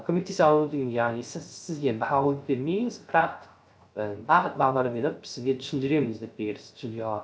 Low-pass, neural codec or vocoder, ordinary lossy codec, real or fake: none; codec, 16 kHz, 0.3 kbps, FocalCodec; none; fake